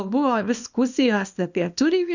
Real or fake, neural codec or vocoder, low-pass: fake; codec, 24 kHz, 0.9 kbps, WavTokenizer, small release; 7.2 kHz